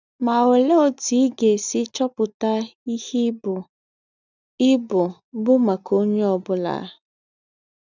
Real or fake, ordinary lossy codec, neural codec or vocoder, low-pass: real; none; none; 7.2 kHz